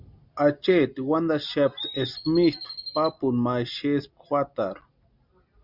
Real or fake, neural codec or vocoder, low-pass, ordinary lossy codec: real; none; 5.4 kHz; Opus, 64 kbps